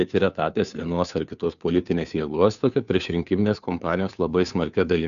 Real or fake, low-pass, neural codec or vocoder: fake; 7.2 kHz; codec, 16 kHz, 2 kbps, FunCodec, trained on Chinese and English, 25 frames a second